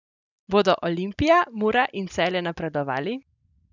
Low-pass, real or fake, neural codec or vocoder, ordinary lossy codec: 7.2 kHz; real; none; none